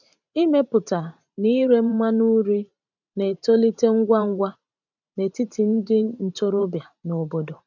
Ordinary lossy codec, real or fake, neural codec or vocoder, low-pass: none; fake; vocoder, 44.1 kHz, 128 mel bands every 512 samples, BigVGAN v2; 7.2 kHz